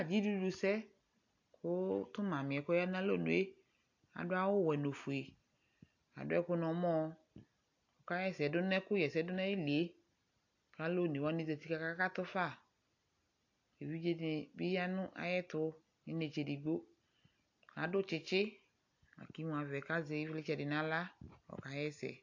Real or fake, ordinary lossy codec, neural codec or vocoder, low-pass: real; AAC, 48 kbps; none; 7.2 kHz